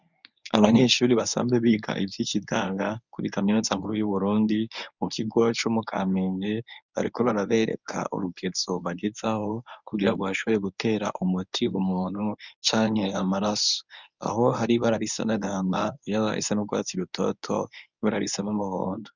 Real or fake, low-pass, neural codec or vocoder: fake; 7.2 kHz; codec, 24 kHz, 0.9 kbps, WavTokenizer, medium speech release version 1